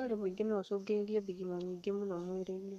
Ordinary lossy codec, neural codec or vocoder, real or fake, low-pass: MP3, 96 kbps; codec, 32 kHz, 1.9 kbps, SNAC; fake; 14.4 kHz